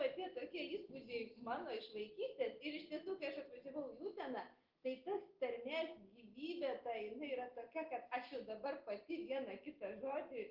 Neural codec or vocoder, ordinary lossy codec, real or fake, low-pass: none; Opus, 16 kbps; real; 5.4 kHz